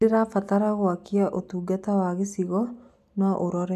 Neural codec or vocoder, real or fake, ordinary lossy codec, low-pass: none; real; none; 14.4 kHz